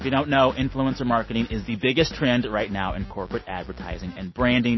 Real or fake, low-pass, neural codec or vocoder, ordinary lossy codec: real; 7.2 kHz; none; MP3, 24 kbps